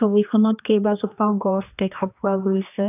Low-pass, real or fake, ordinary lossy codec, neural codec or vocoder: 3.6 kHz; fake; AAC, 32 kbps; codec, 16 kHz, 2 kbps, X-Codec, HuBERT features, trained on general audio